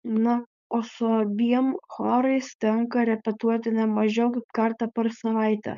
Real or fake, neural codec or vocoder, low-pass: fake; codec, 16 kHz, 4.8 kbps, FACodec; 7.2 kHz